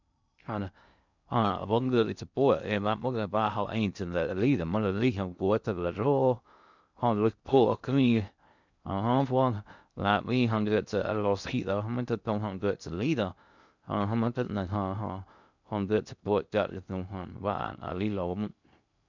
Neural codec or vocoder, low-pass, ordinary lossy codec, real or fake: codec, 16 kHz in and 24 kHz out, 0.6 kbps, FocalCodec, streaming, 2048 codes; 7.2 kHz; none; fake